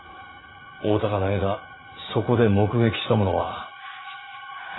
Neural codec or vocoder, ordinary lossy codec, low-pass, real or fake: vocoder, 44.1 kHz, 80 mel bands, Vocos; AAC, 16 kbps; 7.2 kHz; fake